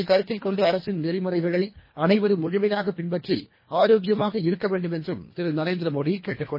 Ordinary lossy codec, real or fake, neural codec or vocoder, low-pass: MP3, 24 kbps; fake; codec, 24 kHz, 1.5 kbps, HILCodec; 5.4 kHz